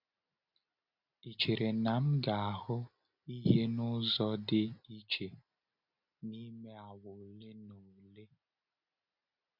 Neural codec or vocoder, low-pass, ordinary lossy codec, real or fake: none; 5.4 kHz; none; real